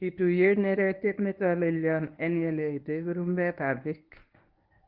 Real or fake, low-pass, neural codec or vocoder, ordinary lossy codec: fake; 5.4 kHz; codec, 24 kHz, 0.9 kbps, WavTokenizer, medium speech release version 1; Opus, 32 kbps